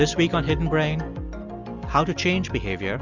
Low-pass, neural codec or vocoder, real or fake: 7.2 kHz; none; real